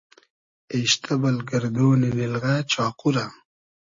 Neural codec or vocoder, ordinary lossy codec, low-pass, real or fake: none; MP3, 32 kbps; 7.2 kHz; real